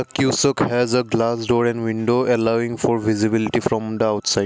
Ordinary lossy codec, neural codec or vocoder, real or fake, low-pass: none; none; real; none